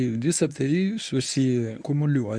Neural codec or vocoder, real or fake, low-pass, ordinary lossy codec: codec, 24 kHz, 0.9 kbps, WavTokenizer, medium speech release version 1; fake; 9.9 kHz; AAC, 64 kbps